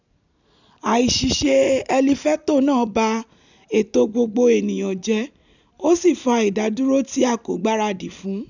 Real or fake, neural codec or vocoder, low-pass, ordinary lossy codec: real; none; 7.2 kHz; none